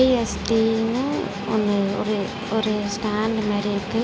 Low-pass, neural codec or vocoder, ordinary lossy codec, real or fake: none; none; none; real